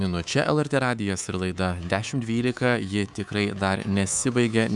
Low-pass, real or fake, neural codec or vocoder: 10.8 kHz; fake; codec, 24 kHz, 3.1 kbps, DualCodec